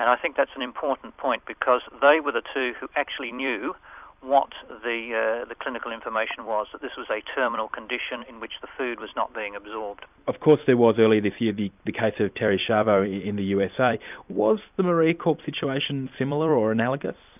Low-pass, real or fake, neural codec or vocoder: 3.6 kHz; fake; vocoder, 44.1 kHz, 128 mel bands every 256 samples, BigVGAN v2